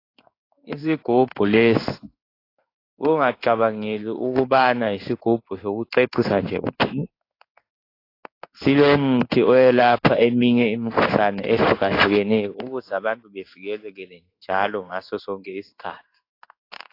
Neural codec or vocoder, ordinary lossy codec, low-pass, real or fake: codec, 16 kHz in and 24 kHz out, 1 kbps, XY-Tokenizer; AAC, 32 kbps; 5.4 kHz; fake